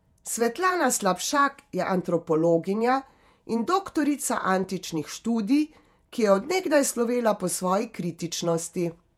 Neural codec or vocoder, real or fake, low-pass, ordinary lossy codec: vocoder, 48 kHz, 128 mel bands, Vocos; fake; 19.8 kHz; MP3, 96 kbps